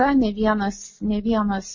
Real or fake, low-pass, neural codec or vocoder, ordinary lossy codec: real; 7.2 kHz; none; MP3, 32 kbps